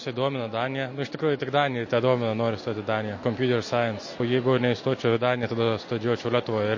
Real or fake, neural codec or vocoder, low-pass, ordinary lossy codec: real; none; 7.2 kHz; MP3, 32 kbps